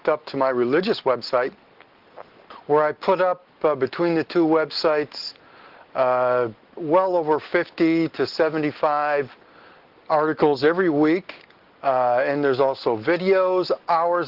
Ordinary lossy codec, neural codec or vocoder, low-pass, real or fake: Opus, 16 kbps; none; 5.4 kHz; real